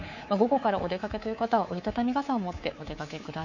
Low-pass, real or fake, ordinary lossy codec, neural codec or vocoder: 7.2 kHz; fake; none; codec, 24 kHz, 3.1 kbps, DualCodec